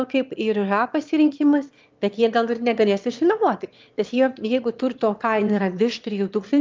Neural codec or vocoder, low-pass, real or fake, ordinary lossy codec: autoencoder, 22.05 kHz, a latent of 192 numbers a frame, VITS, trained on one speaker; 7.2 kHz; fake; Opus, 32 kbps